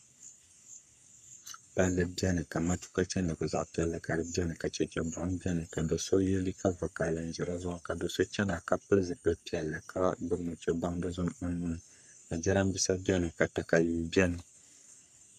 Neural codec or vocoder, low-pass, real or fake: codec, 44.1 kHz, 3.4 kbps, Pupu-Codec; 14.4 kHz; fake